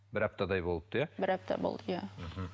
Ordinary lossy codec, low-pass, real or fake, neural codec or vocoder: none; none; real; none